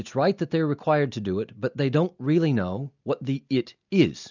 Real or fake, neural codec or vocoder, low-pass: real; none; 7.2 kHz